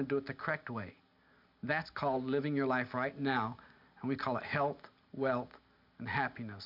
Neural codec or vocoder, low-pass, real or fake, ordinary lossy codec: none; 5.4 kHz; real; MP3, 48 kbps